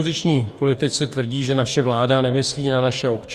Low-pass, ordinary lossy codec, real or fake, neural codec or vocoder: 14.4 kHz; Opus, 64 kbps; fake; codec, 44.1 kHz, 2.6 kbps, DAC